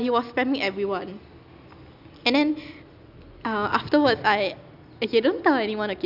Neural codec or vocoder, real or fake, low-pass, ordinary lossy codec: none; real; 5.4 kHz; none